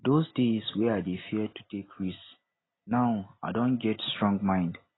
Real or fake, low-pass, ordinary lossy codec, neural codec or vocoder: real; 7.2 kHz; AAC, 16 kbps; none